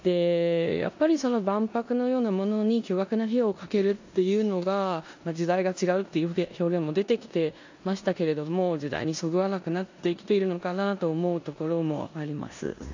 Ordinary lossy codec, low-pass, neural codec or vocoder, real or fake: AAC, 48 kbps; 7.2 kHz; codec, 16 kHz in and 24 kHz out, 0.9 kbps, LongCat-Audio-Codec, four codebook decoder; fake